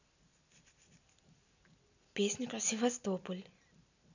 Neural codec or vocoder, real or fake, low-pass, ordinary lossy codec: none; real; 7.2 kHz; none